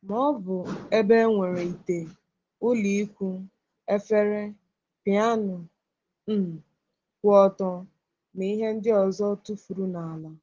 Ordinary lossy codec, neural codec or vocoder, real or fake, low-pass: Opus, 16 kbps; none; real; 7.2 kHz